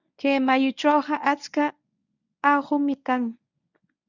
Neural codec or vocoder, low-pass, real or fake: codec, 24 kHz, 0.9 kbps, WavTokenizer, medium speech release version 1; 7.2 kHz; fake